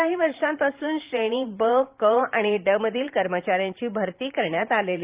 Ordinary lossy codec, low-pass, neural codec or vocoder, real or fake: Opus, 32 kbps; 3.6 kHz; vocoder, 44.1 kHz, 128 mel bands every 512 samples, BigVGAN v2; fake